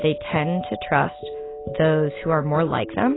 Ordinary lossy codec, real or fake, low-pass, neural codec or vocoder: AAC, 16 kbps; real; 7.2 kHz; none